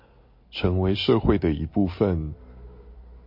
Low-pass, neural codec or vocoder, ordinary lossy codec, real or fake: 5.4 kHz; none; MP3, 32 kbps; real